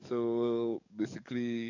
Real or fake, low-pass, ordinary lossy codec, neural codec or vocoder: fake; 7.2 kHz; none; codec, 44.1 kHz, 7.8 kbps, DAC